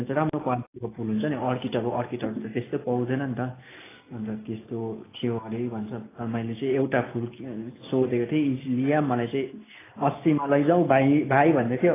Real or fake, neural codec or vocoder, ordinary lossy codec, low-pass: real; none; AAC, 16 kbps; 3.6 kHz